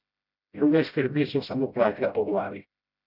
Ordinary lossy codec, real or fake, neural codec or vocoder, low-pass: none; fake; codec, 16 kHz, 0.5 kbps, FreqCodec, smaller model; 5.4 kHz